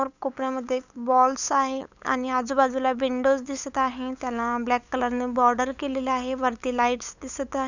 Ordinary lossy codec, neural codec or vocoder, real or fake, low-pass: none; codec, 16 kHz, 8 kbps, FunCodec, trained on LibriTTS, 25 frames a second; fake; 7.2 kHz